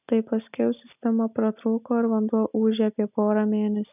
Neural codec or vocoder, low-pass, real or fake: none; 3.6 kHz; real